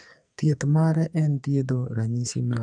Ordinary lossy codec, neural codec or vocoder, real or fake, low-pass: none; codec, 44.1 kHz, 2.6 kbps, SNAC; fake; 9.9 kHz